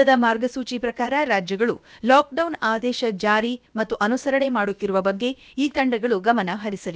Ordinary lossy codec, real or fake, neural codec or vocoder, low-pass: none; fake; codec, 16 kHz, about 1 kbps, DyCAST, with the encoder's durations; none